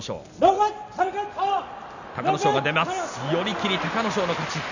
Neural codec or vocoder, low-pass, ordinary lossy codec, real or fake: none; 7.2 kHz; none; real